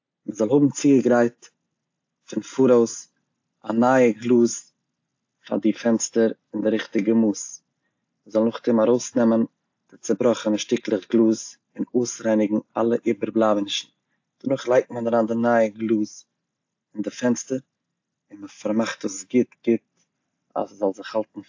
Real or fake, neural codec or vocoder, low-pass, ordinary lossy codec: real; none; 7.2 kHz; AAC, 48 kbps